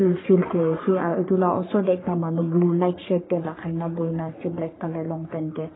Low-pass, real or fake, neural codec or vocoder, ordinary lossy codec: 7.2 kHz; fake; codec, 44.1 kHz, 3.4 kbps, Pupu-Codec; AAC, 16 kbps